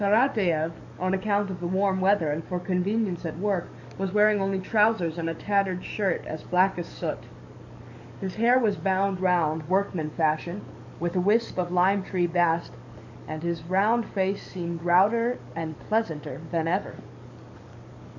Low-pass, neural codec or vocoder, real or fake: 7.2 kHz; codec, 44.1 kHz, 7.8 kbps, DAC; fake